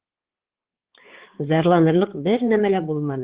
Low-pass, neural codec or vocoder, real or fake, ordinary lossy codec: 3.6 kHz; codec, 16 kHz, 4 kbps, X-Codec, HuBERT features, trained on balanced general audio; fake; Opus, 16 kbps